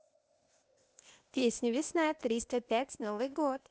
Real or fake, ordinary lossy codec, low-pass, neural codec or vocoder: fake; none; none; codec, 16 kHz, 0.8 kbps, ZipCodec